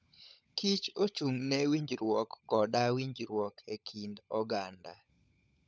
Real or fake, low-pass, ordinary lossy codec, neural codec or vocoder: fake; none; none; codec, 16 kHz, 16 kbps, FunCodec, trained on LibriTTS, 50 frames a second